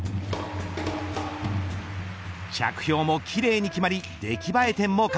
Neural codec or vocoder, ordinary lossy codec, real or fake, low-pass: none; none; real; none